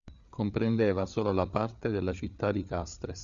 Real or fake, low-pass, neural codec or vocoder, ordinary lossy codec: fake; 7.2 kHz; codec, 16 kHz, 8 kbps, FreqCodec, larger model; Opus, 64 kbps